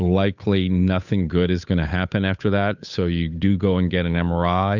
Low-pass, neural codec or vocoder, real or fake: 7.2 kHz; codec, 16 kHz, 8 kbps, FunCodec, trained on Chinese and English, 25 frames a second; fake